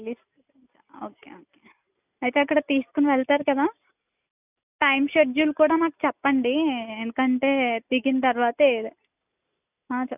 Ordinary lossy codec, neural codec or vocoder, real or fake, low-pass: none; none; real; 3.6 kHz